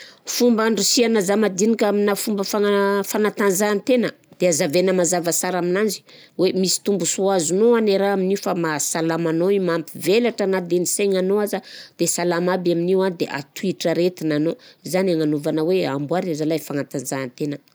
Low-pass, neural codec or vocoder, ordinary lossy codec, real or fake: none; none; none; real